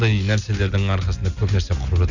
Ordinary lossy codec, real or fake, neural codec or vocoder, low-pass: none; real; none; 7.2 kHz